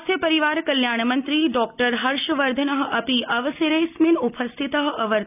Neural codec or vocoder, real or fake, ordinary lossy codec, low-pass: none; real; none; 3.6 kHz